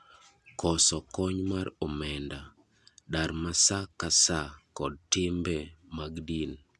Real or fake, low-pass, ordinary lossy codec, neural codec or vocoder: real; none; none; none